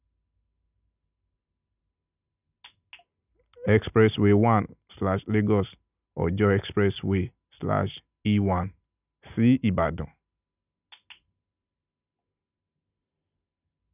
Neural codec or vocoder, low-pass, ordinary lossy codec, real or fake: none; 3.6 kHz; none; real